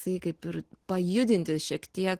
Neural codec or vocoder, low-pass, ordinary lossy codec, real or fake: none; 14.4 kHz; Opus, 16 kbps; real